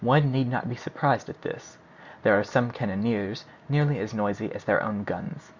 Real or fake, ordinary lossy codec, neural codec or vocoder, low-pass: real; Opus, 64 kbps; none; 7.2 kHz